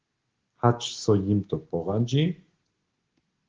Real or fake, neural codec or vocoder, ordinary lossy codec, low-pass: real; none; Opus, 16 kbps; 7.2 kHz